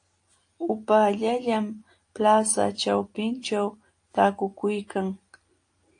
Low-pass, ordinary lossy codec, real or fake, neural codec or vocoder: 9.9 kHz; AAC, 48 kbps; real; none